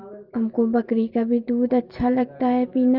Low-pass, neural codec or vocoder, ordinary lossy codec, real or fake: 5.4 kHz; none; Opus, 24 kbps; real